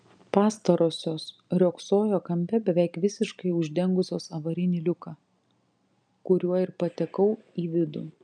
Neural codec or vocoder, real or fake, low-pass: none; real; 9.9 kHz